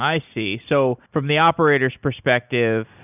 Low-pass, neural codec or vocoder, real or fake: 3.6 kHz; none; real